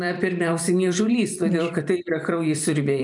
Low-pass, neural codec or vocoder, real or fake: 10.8 kHz; vocoder, 44.1 kHz, 128 mel bands every 256 samples, BigVGAN v2; fake